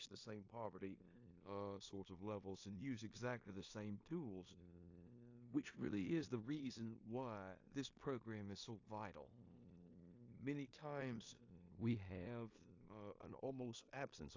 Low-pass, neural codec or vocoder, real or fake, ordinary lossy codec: 7.2 kHz; codec, 16 kHz in and 24 kHz out, 0.9 kbps, LongCat-Audio-Codec, four codebook decoder; fake; AAC, 48 kbps